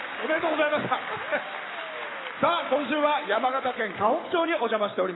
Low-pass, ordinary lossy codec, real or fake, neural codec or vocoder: 7.2 kHz; AAC, 16 kbps; fake; codec, 44.1 kHz, 7.8 kbps, Pupu-Codec